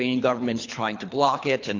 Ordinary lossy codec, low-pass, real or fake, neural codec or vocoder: AAC, 48 kbps; 7.2 kHz; fake; codec, 24 kHz, 3 kbps, HILCodec